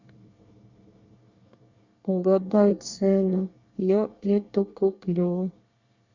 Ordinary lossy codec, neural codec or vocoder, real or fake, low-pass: Opus, 64 kbps; codec, 24 kHz, 1 kbps, SNAC; fake; 7.2 kHz